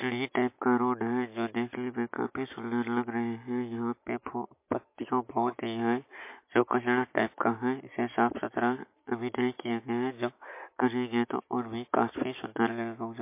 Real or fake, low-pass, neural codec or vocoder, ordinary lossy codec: real; 3.6 kHz; none; MP3, 24 kbps